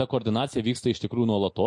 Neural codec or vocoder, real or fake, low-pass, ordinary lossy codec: none; real; 10.8 kHz; MP3, 48 kbps